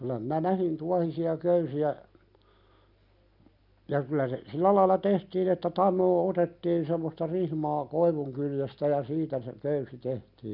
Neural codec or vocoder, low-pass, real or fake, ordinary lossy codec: none; 5.4 kHz; real; none